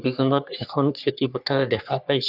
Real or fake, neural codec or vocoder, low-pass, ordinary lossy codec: fake; codec, 44.1 kHz, 3.4 kbps, Pupu-Codec; 5.4 kHz; none